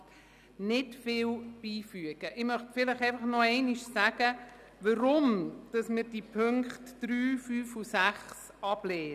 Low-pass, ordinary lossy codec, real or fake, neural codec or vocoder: 14.4 kHz; none; real; none